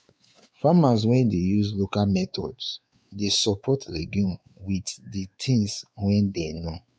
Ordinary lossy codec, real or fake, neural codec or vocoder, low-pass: none; fake; codec, 16 kHz, 4 kbps, X-Codec, WavLM features, trained on Multilingual LibriSpeech; none